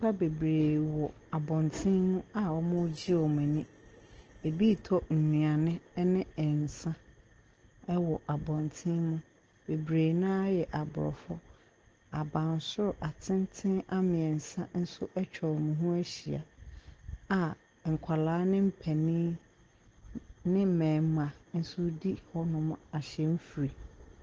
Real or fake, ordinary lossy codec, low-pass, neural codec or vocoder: real; Opus, 16 kbps; 7.2 kHz; none